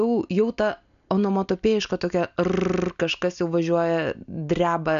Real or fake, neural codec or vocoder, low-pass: real; none; 7.2 kHz